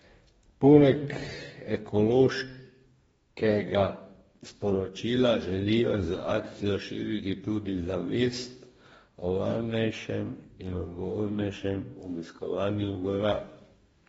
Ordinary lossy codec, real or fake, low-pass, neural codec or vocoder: AAC, 24 kbps; fake; 19.8 kHz; codec, 44.1 kHz, 2.6 kbps, DAC